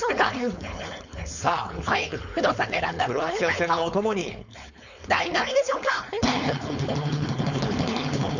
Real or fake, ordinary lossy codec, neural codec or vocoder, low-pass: fake; none; codec, 16 kHz, 4.8 kbps, FACodec; 7.2 kHz